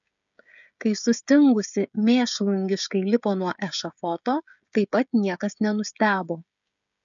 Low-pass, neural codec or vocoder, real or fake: 7.2 kHz; codec, 16 kHz, 16 kbps, FreqCodec, smaller model; fake